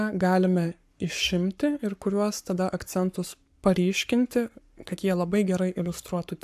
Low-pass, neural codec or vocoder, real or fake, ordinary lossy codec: 14.4 kHz; codec, 44.1 kHz, 7.8 kbps, Pupu-Codec; fake; AAC, 96 kbps